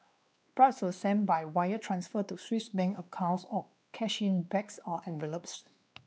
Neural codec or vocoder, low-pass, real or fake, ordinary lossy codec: codec, 16 kHz, 2 kbps, X-Codec, WavLM features, trained on Multilingual LibriSpeech; none; fake; none